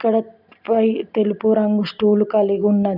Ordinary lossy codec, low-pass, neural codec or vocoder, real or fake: none; 5.4 kHz; none; real